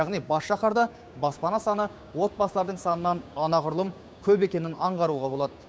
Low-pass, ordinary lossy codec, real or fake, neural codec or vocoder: none; none; fake; codec, 16 kHz, 6 kbps, DAC